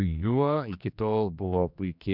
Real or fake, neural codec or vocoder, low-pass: fake; codec, 16 kHz, 2 kbps, X-Codec, HuBERT features, trained on general audio; 5.4 kHz